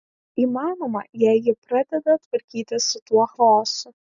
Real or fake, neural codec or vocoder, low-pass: real; none; 7.2 kHz